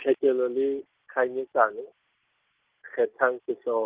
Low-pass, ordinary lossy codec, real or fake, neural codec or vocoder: 3.6 kHz; Opus, 16 kbps; real; none